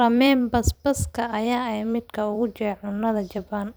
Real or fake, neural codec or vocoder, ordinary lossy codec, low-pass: fake; vocoder, 44.1 kHz, 128 mel bands every 256 samples, BigVGAN v2; none; none